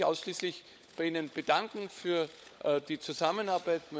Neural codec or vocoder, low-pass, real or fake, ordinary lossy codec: codec, 16 kHz, 16 kbps, FunCodec, trained on LibriTTS, 50 frames a second; none; fake; none